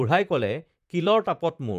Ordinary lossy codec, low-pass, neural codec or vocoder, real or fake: none; 14.4 kHz; vocoder, 44.1 kHz, 128 mel bands, Pupu-Vocoder; fake